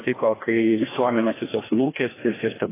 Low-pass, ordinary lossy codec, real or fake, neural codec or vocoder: 3.6 kHz; AAC, 16 kbps; fake; codec, 16 kHz, 1 kbps, FreqCodec, larger model